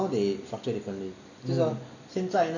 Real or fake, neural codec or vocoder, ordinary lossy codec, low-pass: real; none; none; 7.2 kHz